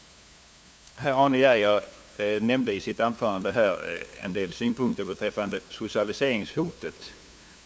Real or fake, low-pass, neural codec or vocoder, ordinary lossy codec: fake; none; codec, 16 kHz, 2 kbps, FunCodec, trained on LibriTTS, 25 frames a second; none